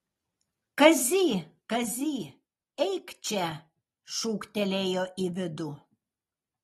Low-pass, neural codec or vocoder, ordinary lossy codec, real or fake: 19.8 kHz; none; AAC, 32 kbps; real